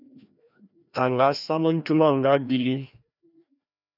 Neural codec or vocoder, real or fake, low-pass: codec, 16 kHz, 1 kbps, FreqCodec, larger model; fake; 5.4 kHz